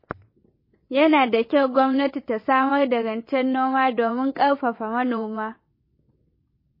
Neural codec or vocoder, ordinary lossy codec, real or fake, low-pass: vocoder, 22.05 kHz, 80 mel bands, WaveNeXt; MP3, 24 kbps; fake; 5.4 kHz